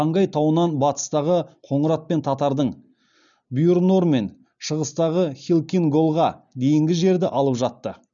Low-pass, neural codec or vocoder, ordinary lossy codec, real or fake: 7.2 kHz; none; none; real